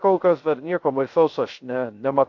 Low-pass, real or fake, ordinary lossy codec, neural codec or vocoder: 7.2 kHz; fake; AAC, 48 kbps; codec, 16 kHz, 0.3 kbps, FocalCodec